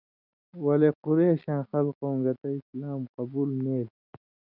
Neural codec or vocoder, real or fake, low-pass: none; real; 5.4 kHz